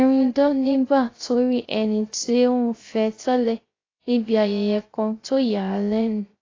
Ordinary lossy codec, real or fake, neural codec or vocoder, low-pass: AAC, 32 kbps; fake; codec, 16 kHz, 0.3 kbps, FocalCodec; 7.2 kHz